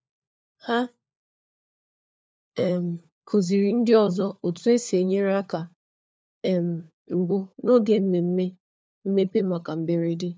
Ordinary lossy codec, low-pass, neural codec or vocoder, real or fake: none; none; codec, 16 kHz, 4 kbps, FunCodec, trained on LibriTTS, 50 frames a second; fake